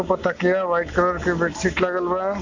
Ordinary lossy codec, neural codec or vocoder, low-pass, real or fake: AAC, 48 kbps; none; 7.2 kHz; real